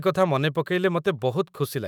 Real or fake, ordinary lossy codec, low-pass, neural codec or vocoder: fake; none; none; autoencoder, 48 kHz, 128 numbers a frame, DAC-VAE, trained on Japanese speech